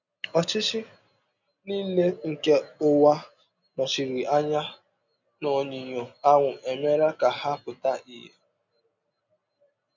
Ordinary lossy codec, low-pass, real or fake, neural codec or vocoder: none; 7.2 kHz; real; none